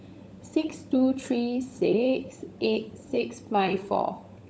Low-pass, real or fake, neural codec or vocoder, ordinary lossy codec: none; fake; codec, 16 kHz, 16 kbps, FunCodec, trained on LibriTTS, 50 frames a second; none